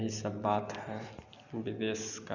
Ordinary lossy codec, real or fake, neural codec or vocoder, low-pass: none; real; none; 7.2 kHz